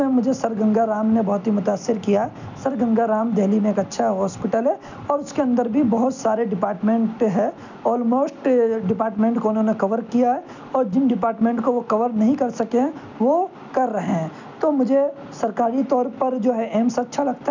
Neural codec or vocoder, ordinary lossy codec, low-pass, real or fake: none; none; 7.2 kHz; real